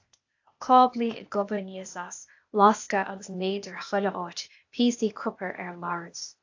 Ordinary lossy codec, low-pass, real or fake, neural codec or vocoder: AAC, 48 kbps; 7.2 kHz; fake; codec, 16 kHz, 0.8 kbps, ZipCodec